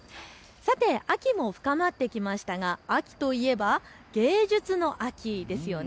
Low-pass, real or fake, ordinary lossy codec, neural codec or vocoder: none; real; none; none